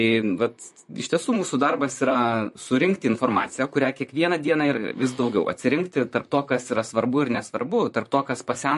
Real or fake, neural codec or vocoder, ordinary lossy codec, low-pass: fake; vocoder, 44.1 kHz, 128 mel bands, Pupu-Vocoder; MP3, 48 kbps; 14.4 kHz